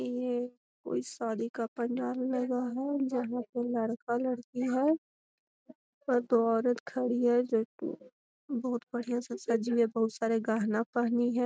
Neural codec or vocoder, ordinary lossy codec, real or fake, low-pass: none; none; real; none